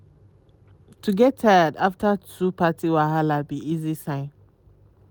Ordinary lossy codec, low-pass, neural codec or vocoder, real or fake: none; none; none; real